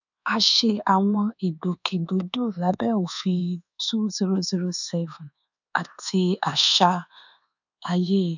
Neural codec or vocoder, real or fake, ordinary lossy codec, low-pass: codec, 24 kHz, 1.2 kbps, DualCodec; fake; none; 7.2 kHz